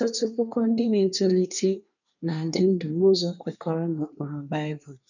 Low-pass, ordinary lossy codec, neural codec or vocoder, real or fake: 7.2 kHz; none; codec, 24 kHz, 1 kbps, SNAC; fake